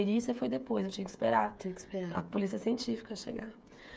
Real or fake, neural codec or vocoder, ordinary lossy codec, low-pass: fake; codec, 16 kHz, 8 kbps, FreqCodec, smaller model; none; none